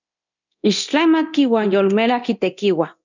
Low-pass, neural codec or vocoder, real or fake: 7.2 kHz; codec, 24 kHz, 0.9 kbps, DualCodec; fake